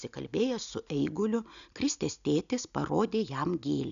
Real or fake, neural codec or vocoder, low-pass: real; none; 7.2 kHz